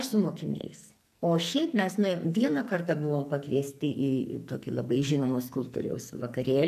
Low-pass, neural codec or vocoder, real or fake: 14.4 kHz; codec, 44.1 kHz, 2.6 kbps, SNAC; fake